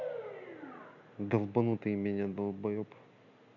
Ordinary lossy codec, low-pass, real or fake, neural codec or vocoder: none; 7.2 kHz; real; none